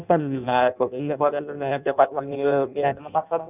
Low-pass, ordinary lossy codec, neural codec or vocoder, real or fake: 3.6 kHz; none; codec, 16 kHz in and 24 kHz out, 0.6 kbps, FireRedTTS-2 codec; fake